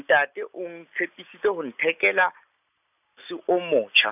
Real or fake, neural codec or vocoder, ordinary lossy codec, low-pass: real; none; none; 3.6 kHz